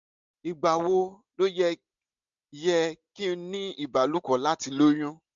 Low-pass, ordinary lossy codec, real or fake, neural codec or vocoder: 7.2 kHz; MP3, 64 kbps; real; none